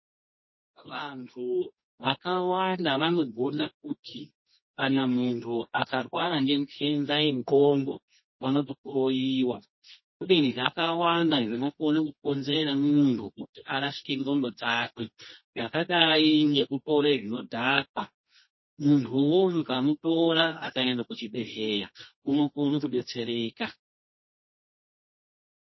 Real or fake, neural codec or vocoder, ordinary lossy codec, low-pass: fake; codec, 24 kHz, 0.9 kbps, WavTokenizer, medium music audio release; MP3, 24 kbps; 7.2 kHz